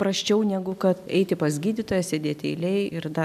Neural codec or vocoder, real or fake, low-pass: none; real; 14.4 kHz